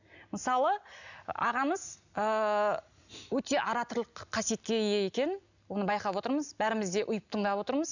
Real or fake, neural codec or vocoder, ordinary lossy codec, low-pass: real; none; none; 7.2 kHz